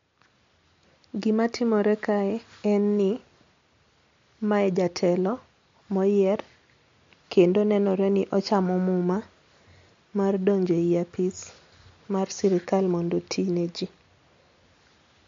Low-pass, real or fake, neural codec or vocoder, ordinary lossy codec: 7.2 kHz; real; none; MP3, 48 kbps